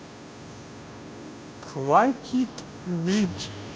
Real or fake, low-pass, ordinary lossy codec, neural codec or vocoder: fake; none; none; codec, 16 kHz, 0.5 kbps, FunCodec, trained on Chinese and English, 25 frames a second